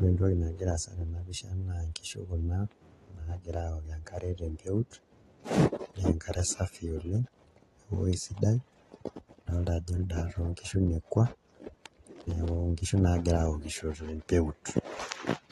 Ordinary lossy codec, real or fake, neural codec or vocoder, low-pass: AAC, 32 kbps; real; none; 19.8 kHz